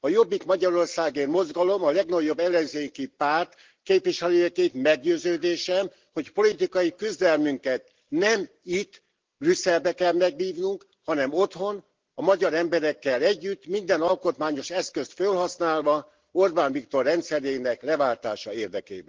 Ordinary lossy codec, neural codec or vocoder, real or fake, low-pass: Opus, 16 kbps; none; real; 7.2 kHz